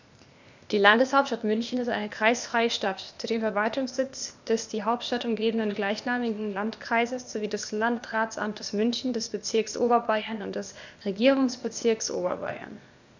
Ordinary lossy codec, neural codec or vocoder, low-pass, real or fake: none; codec, 16 kHz, 0.8 kbps, ZipCodec; 7.2 kHz; fake